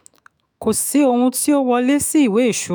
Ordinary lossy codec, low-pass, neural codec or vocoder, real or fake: none; none; autoencoder, 48 kHz, 128 numbers a frame, DAC-VAE, trained on Japanese speech; fake